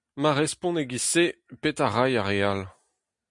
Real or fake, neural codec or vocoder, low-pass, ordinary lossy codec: real; none; 10.8 kHz; MP3, 64 kbps